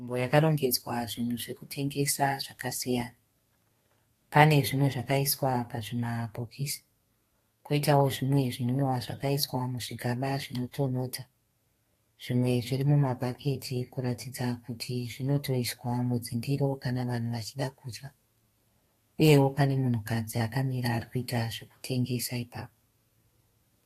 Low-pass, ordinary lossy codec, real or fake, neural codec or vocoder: 14.4 kHz; AAC, 48 kbps; fake; codec, 32 kHz, 1.9 kbps, SNAC